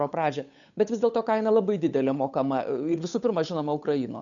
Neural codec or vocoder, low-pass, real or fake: codec, 16 kHz, 8 kbps, FunCodec, trained on Chinese and English, 25 frames a second; 7.2 kHz; fake